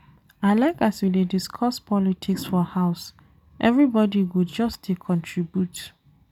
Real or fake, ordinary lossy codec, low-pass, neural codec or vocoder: real; none; 19.8 kHz; none